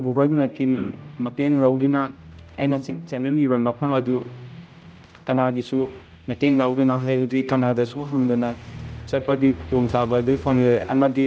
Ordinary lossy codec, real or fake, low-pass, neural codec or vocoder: none; fake; none; codec, 16 kHz, 0.5 kbps, X-Codec, HuBERT features, trained on general audio